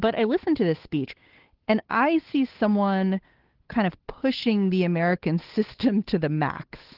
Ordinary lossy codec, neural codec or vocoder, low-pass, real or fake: Opus, 16 kbps; codec, 16 kHz, 8 kbps, FunCodec, trained on Chinese and English, 25 frames a second; 5.4 kHz; fake